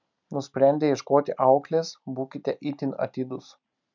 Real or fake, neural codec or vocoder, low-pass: real; none; 7.2 kHz